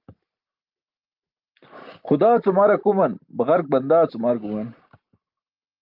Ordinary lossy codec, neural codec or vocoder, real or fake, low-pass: Opus, 32 kbps; none; real; 5.4 kHz